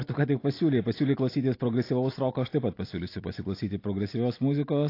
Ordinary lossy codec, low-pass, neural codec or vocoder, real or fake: AAC, 32 kbps; 5.4 kHz; none; real